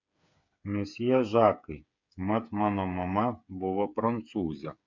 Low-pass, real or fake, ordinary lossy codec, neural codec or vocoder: 7.2 kHz; fake; MP3, 64 kbps; codec, 16 kHz, 8 kbps, FreqCodec, smaller model